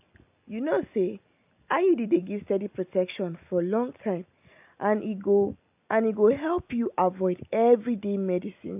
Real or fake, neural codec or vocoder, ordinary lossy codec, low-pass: real; none; AAC, 32 kbps; 3.6 kHz